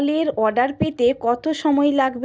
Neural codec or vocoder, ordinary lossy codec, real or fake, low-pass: none; none; real; none